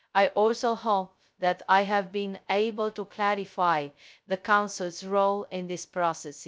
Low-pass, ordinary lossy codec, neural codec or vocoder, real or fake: none; none; codec, 16 kHz, 0.2 kbps, FocalCodec; fake